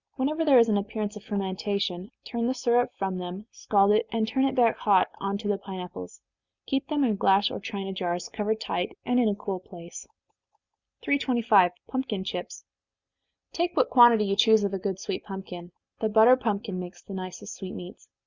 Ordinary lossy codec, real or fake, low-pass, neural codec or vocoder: Opus, 64 kbps; real; 7.2 kHz; none